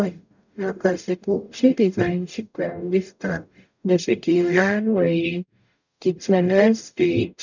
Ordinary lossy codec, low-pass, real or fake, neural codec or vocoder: none; 7.2 kHz; fake; codec, 44.1 kHz, 0.9 kbps, DAC